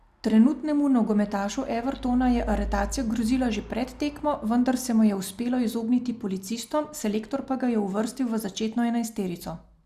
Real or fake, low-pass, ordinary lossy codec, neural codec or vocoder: real; 14.4 kHz; Opus, 64 kbps; none